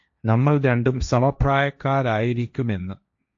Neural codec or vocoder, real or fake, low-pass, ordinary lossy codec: codec, 16 kHz, 1.1 kbps, Voila-Tokenizer; fake; 7.2 kHz; AAC, 64 kbps